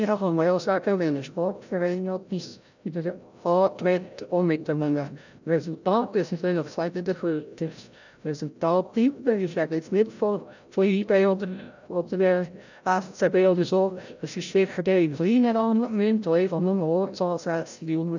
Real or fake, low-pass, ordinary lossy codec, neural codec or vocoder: fake; 7.2 kHz; none; codec, 16 kHz, 0.5 kbps, FreqCodec, larger model